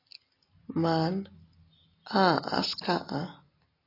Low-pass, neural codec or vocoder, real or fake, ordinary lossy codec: 5.4 kHz; none; real; AAC, 24 kbps